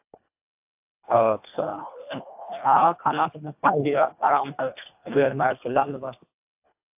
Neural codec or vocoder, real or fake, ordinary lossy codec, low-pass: codec, 24 kHz, 1.5 kbps, HILCodec; fake; AAC, 32 kbps; 3.6 kHz